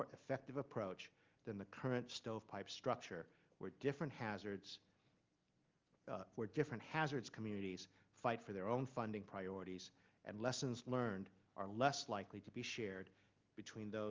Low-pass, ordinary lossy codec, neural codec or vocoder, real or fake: 7.2 kHz; Opus, 32 kbps; none; real